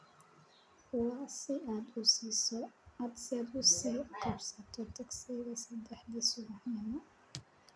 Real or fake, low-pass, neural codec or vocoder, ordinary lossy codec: real; none; none; none